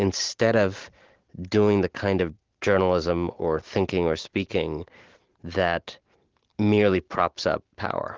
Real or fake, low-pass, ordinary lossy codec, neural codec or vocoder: real; 7.2 kHz; Opus, 16 kbps; none